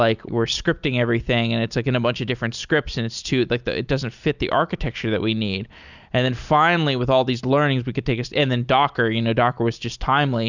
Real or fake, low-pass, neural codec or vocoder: real; 7.2 kHz; none